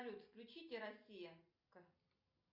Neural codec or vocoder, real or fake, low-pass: none; real; 5.4 kHz